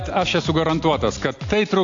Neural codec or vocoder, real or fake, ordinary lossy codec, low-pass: none; real; AAC, 48 kbps; 7.2 kHz